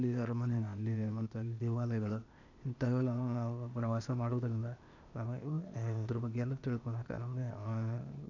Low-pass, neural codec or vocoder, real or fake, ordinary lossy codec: 7.2 kHz; codec, 16 kHz, 0.8 kbps, ZipCodec; fake; none